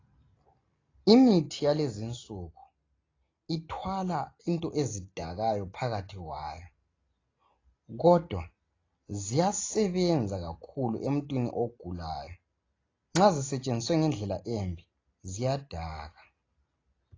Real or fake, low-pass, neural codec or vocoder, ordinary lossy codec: real; 7.2 kHz; none; AAC, 32 kbps